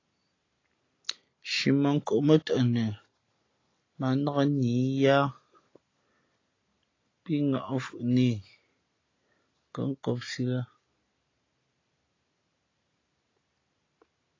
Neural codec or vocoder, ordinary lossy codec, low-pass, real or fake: none; AAC, 32 kbps; 7.2 kHz; real